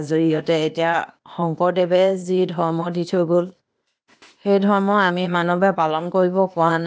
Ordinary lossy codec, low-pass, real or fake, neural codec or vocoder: none; none; fake; codec, 16 kHz, 0.8 kbps, ZipCodec